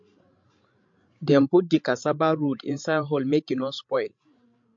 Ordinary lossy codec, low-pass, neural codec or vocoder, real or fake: MP3, 48 kbps; 7.2 kHz; codec, 16 kHz, 16 kbps, FreqCodec, larger model; fake